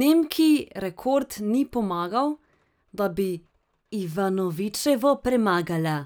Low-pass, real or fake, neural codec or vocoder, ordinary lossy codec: none; real; none; none